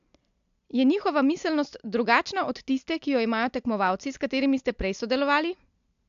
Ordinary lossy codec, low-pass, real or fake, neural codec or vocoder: AAC, 64 kbps; 7.2 kHz; real; none